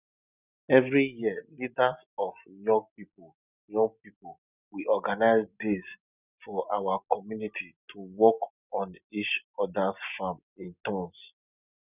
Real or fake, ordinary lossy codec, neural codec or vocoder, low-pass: real; none; none; 3.6 kHz